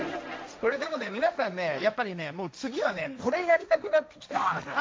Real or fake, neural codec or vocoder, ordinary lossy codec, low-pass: fake; codec, 16 kHz, 1.1 kbps, Voila-Tokenizer; none; none